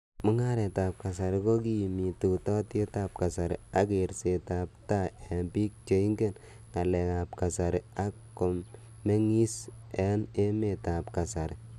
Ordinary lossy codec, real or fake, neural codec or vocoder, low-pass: none; real; none; 14.4 kHz